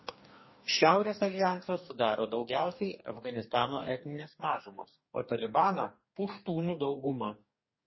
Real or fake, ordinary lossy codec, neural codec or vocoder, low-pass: fake; MP3, 24 kbps; codec, 44.1 kHz, 2.6 kbps, DAC; 7.2 kHz